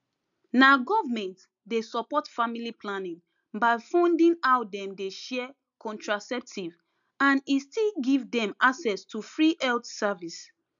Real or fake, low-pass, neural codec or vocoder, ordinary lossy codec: real; 7.2 kHz; none; none